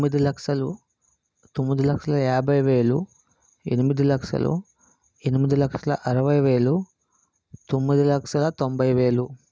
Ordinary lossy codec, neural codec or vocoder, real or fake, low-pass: none; none; real; none